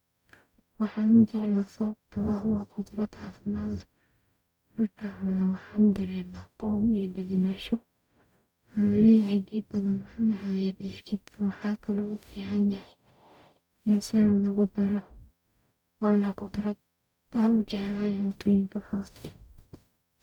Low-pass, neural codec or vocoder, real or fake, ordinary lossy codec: 19.8 kHz; codec, 44.1 kHz, 0.9 kbps, DAC; fake; none